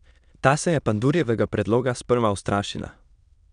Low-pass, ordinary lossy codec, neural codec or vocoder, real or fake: 9.9 kHz; none; autoencoder, 22.05 kHz, a latent of 192 numbers a frame, VITS, trained on many speakers; fake